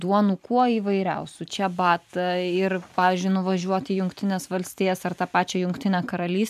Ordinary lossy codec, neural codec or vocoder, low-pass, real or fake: MP3, 96 kbps; vocoder, 44.1 kHz, 128 mel bands every 256 samples, BigVGAN v2; 14.4 kHz; fake